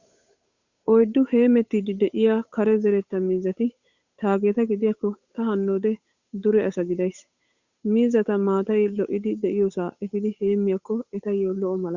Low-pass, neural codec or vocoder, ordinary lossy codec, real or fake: 7.2 kHz; codec, 16 kHz, 8 kbps, FunCodec, trained on Chinese and English, 25 frames a second; Opus, 64 kbps; fake